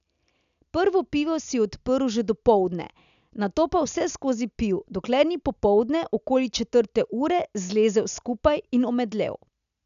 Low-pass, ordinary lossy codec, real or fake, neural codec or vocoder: 7.2 kHz; none; real; none